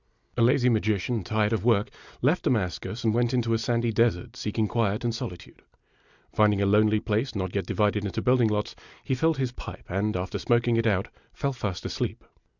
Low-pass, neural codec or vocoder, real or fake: 7.2 kHz; none; real